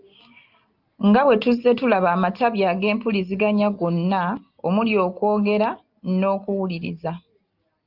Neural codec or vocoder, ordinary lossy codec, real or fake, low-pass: none; Opus, 32 kbps; real; 5.4 kHz